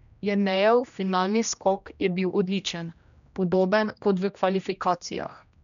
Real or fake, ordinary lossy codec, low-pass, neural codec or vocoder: fake; none; 7.2 kHz; codec, 16 kHz, 1 kbps, X-Codec, HuBERT features, trained on general audio